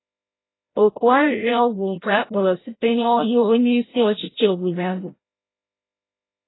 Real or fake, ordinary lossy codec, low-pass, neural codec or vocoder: fake; AAC, 16 kbps; 7.2 kHz; codec, 16 kHz, 0.5 kbps, FreqCodec, larger model